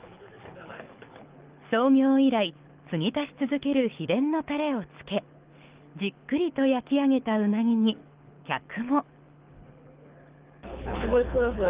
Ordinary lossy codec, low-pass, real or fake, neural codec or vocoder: Opus, 32 kbps; 3.6 kHz; fake; codec, 24 kHz, 6 kbps, HILCodec